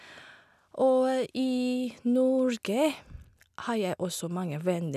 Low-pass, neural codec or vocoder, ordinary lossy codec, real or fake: 14.4 kHz; none; none; real